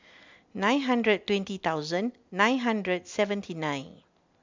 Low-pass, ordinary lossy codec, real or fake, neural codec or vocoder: 7.2 kHz; MP3, 64 kbps; real; none